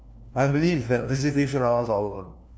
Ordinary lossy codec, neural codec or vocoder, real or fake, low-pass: none; codec, 16 kHz, 1 kbps, FunCodec, trained on LibriTTS, 50 frames a second; fake; none